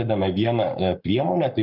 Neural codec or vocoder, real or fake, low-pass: codec, 44.1 kHz, 7.8 kbps, Pupu-Codec; fake; 5.4 kHz